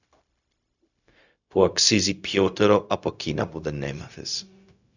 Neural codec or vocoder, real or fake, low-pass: codec, 16 kHz, 0.4 kbps, LongCat-Audio-Codec; fake; 7.2 kHz